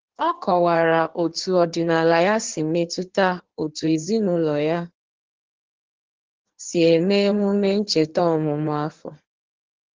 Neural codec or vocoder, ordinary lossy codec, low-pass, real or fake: codec, 16 kHz in and 24 kHz out, 1.1 kbps, FireRedTTS-2 codec; Opus, 16 kbps; 7.2 kHz; fake